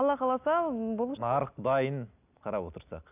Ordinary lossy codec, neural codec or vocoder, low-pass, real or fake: none; none; 3.6 kHz; real